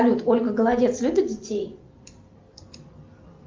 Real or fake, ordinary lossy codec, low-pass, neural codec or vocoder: real; Opus, 32 kbps; 7.2 kHz; none